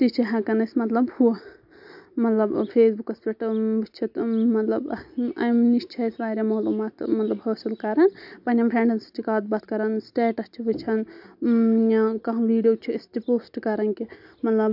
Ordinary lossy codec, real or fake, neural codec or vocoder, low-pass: AAC, 48 kbps; real; none; 5.4 kHz